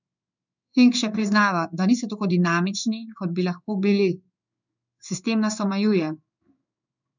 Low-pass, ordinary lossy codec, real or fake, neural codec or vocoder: 7.2 kHz; none; fake; codec, 16 kHz in and 24 kHz out, 1 kbps, XY-Tokenizer